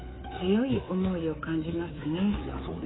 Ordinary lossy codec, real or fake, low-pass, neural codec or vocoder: AAC, 16 kbps; fake; 7.2 kHz; codec, 24 kHz, 3.1 kbps, DualCodec